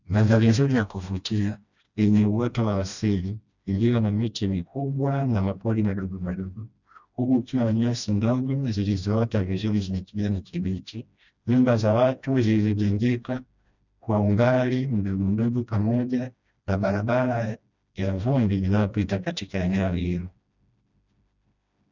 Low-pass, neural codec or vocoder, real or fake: 7.2 kHz; codec, 16 kHz, 1 kbps, FreqCodec, smaller model; fake